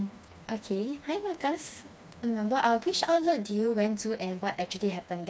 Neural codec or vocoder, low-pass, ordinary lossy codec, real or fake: codec, 16 kHz, 2 kbps, FreqCodec, smaller model; none; none; fake